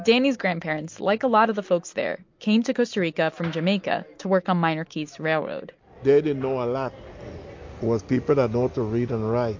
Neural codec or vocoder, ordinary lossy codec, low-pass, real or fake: none; MP3, 48 kbps; 7.2 kHz; real